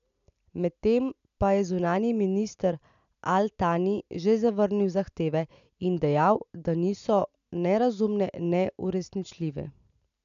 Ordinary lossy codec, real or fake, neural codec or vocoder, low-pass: AAC, 96 kbps; real; none; 7.2 kHz